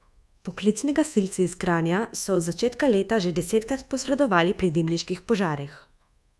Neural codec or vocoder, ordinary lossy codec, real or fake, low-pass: codec, 24 kHz, 1.2 kbps, DualCodec; none; fake; none